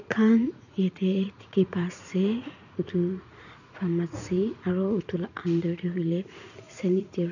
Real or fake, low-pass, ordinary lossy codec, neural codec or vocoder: fake; 7.2 kHz; none; vocoder, 44.1 kHz, 80 mel bands, Vocos